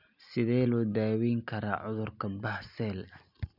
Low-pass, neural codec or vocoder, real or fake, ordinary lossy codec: 5.4 kHz; none; real; none